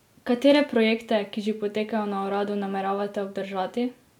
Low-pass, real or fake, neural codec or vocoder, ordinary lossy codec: 19.8 kHz; real; none; none